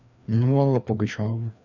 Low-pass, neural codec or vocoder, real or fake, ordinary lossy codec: 7.2 kHz; codec, 16 kHz, 2 kbps, FreqCodec, larger model; fake; none